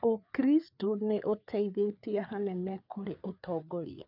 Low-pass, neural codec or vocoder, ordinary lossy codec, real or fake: 5.4 kHz; codec, 16 kHz, 4 kbps, FreqCodec, larger model; none; fake